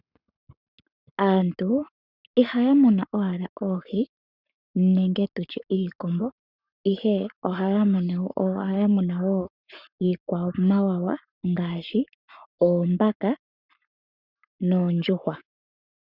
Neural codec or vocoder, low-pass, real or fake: codec, 44.1 kHz, 7.8 kbps, Pupu-Codec; 5.4 kHz; fake